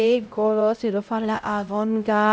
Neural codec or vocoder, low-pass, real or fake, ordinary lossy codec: codec, 16 kHz, 0.5 kbps, X-Codec, HuBERT features, trained on LibriSpeech; none; fake; none